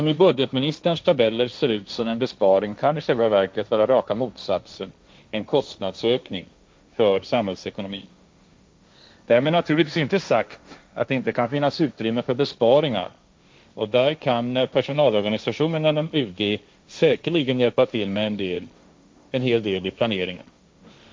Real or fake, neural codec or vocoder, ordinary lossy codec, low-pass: fake; codec, 16 kHz, 1.1 kbps, Voila-Tokenizer; none; none